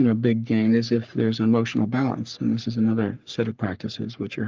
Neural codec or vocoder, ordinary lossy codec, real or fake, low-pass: codec, 44.1 kHz, 3.4 kbps, Pupu-Codec; Opus, 32 kbps; fake; 7.2 kHz